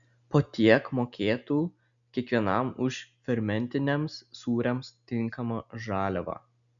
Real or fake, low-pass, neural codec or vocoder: real; 7.2 kHz; none